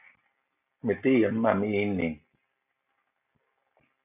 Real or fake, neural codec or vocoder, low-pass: real; none; 3.6 kHz